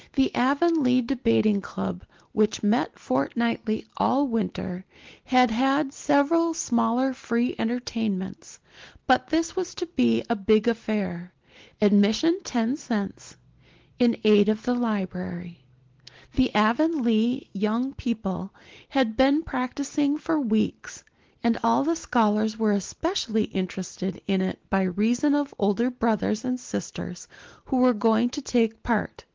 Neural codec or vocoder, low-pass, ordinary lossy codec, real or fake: none; 7.2 kHz; Opus, 16 kbps; real